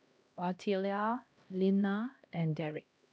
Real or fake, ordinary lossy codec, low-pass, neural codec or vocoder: fake; none; none; codec, 16 kHz, 1 kbps, X-Codec, HuBERT features, trained on LibriSpeech